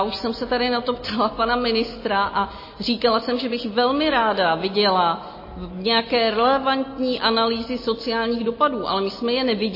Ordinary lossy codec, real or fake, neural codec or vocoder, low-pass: MP3, 24 kbps; real; none; 5.4 kHz